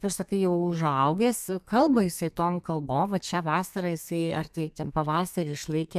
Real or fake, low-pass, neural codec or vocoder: fake; 14.4 kHz; codec, 32 kHz, 1.9 kbps, SNAC